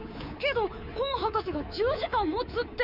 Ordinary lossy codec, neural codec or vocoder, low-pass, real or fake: none; codec, 24 kHz, 3.1 kbps, DualCodec; 5.4 kHz; fake